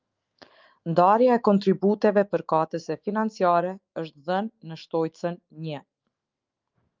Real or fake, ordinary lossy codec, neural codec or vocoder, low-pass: fake; Opus, 24 kbps; autoencoder, 48 kHz, 128 numbers a frame, DAC-VAE, trained on Japanese speech; 7.2 kHz